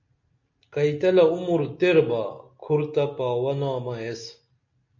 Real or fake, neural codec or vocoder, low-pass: real; none; 7.2 kHz